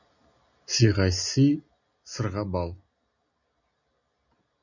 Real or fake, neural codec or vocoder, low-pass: real; none; 7.2 kHz